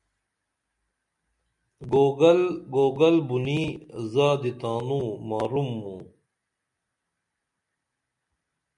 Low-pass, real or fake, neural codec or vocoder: 10.8 kHz; real; none